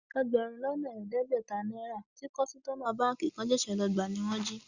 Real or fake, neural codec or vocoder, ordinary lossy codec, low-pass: real; none; Opus, 64 kbps; 7.2 kHz